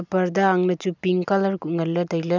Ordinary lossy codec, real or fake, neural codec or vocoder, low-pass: none; real; none; 7.2 kHz